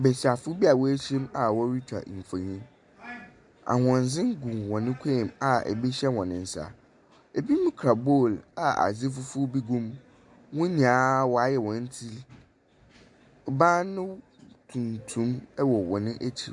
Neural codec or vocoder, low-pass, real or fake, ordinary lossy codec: none; 10.8 kHz; real; MP3, 64 kbps